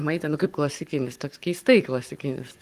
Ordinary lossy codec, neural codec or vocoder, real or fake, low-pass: Opus, 24 kbps; codec, 44.1 kHz, 7.8 kbps, Pupu-Codec; fake; 14.4 kHz